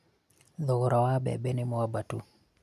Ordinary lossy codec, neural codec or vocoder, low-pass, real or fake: none; none; 14.4 kHz; real